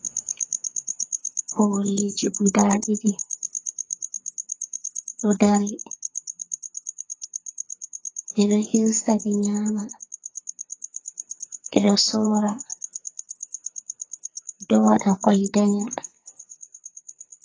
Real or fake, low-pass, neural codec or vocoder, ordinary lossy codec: fake; 7.2 kHz; codec, 44.1 kHz, 2.6 kbps, SNAC; AAC, 32 kbps